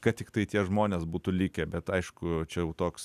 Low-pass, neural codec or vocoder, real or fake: 14.4 kHz; none; real